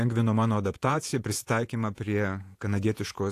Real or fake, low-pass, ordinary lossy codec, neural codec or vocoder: fake; 14.4 kHz; AAC, 64 kbps; vocoder, 48 kHz, 128 mel bands, Vocos